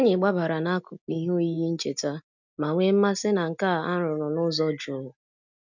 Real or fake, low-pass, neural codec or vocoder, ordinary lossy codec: real; 7.2 kHz; none; none